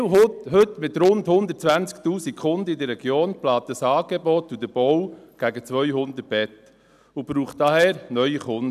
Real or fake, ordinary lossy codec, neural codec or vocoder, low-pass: real; none; none; 14.4 kHz